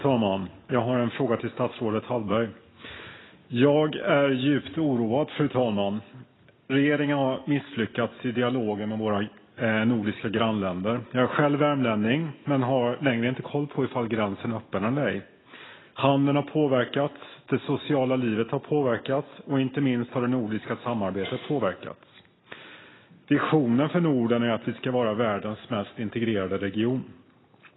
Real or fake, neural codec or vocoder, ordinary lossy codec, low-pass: real; none; AAC, 16 kbps; 7.2 kHz